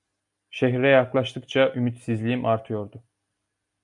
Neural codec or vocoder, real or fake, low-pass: none; real; 10.8 kHz